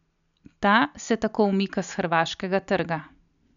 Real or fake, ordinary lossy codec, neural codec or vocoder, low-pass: real; none; none; 7.2 kHz